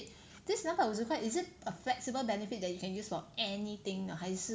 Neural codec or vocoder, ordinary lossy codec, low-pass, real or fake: none; none; none; real